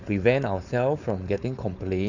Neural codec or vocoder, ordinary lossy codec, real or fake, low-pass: codec, 16 kHz, 4.8 kbps, FACodec; none; fake; 7.2 kHz